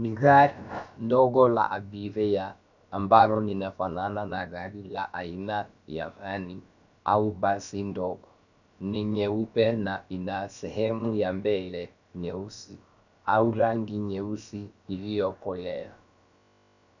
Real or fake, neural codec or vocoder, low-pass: fake; codec, 16 kHz, about 1 kbps, DyCAST, with the encoder's durations; 7.2 kHz